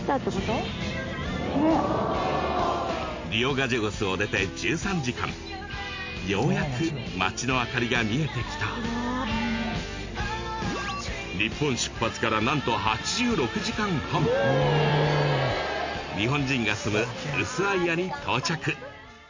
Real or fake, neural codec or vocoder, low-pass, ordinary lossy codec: real; none; 7.2 kHz; MP3, 48 kbps